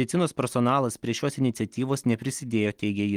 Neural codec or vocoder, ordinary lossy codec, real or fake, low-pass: none; Opus, 24 kbps; real; 19.8 kHz